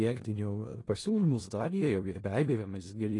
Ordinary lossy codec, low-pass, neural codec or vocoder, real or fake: AAC, 32 kbps; 10.8 kHz; codec, 16 kHz in and 24 kHz out, 0.4 kbps, LongCat-Audio-Codec, four codebook decoder; fake